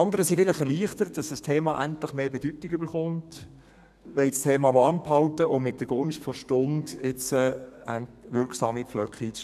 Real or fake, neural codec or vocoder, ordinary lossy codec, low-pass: fake; codec, 32 kHz, 1.9 kbps, SNAC; none; 14.4 kHz